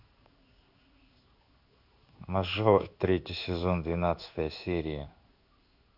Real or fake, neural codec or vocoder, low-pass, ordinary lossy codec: fake; codec, 16 kHz in and 24 kHz out, 1 kbps, XY-Tokenizer; 5.4 kHz; none